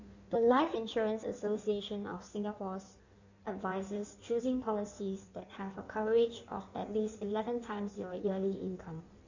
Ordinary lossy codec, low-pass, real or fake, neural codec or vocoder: none; 7.2 kHz; fake; codec, 16 kHz in and 24 kHz out, 1.1 kbps, FireRedTTS-2 codec